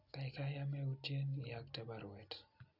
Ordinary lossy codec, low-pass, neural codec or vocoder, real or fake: Opus, 64 kbps; 5.4 kHz; none; real